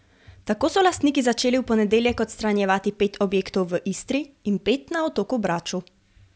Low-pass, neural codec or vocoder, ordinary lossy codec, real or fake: none; none; none; real